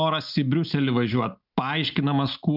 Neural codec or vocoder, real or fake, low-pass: none; real; 5.4 kHz